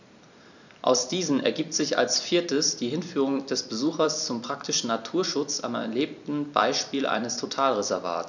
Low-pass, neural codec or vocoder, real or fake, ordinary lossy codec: 7.2 kHz; vocoder, 44.1 kHz, 128 mel bands every 256 samples, BigVGAN v2; fake; none